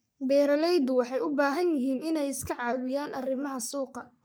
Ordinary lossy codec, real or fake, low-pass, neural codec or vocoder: none; fake; none; codec, 44.1 kHz, 3.4 kbps, Pupu-Codec